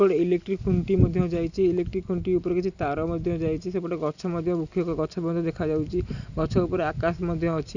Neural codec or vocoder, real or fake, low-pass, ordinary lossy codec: none; real; 7.2 kHz; none